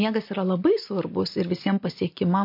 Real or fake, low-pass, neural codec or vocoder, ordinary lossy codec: real; 5.4 kHz; none; MP3, 32 kbps